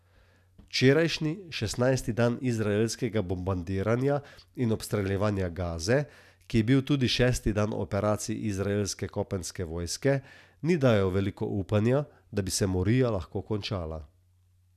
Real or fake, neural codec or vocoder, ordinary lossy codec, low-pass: real; none; none; 14.4 kHz